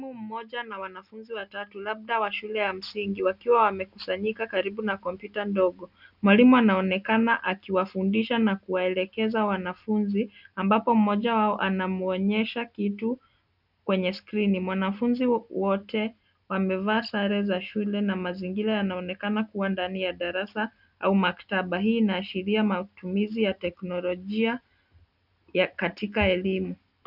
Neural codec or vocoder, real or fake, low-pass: none; real; 5.4 kHz